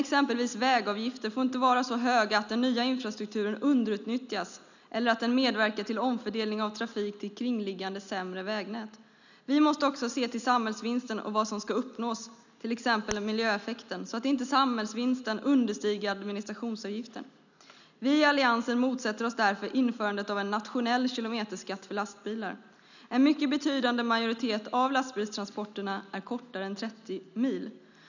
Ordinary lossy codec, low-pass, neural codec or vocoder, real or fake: none; 7.2 kHz; none; real